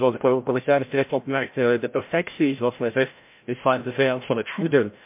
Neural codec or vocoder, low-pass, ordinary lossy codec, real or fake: codec, 16 kHz, 0.5 kbps, FreqCodec, larger model; 3.6 kHz; MP3, 32 kbps; fake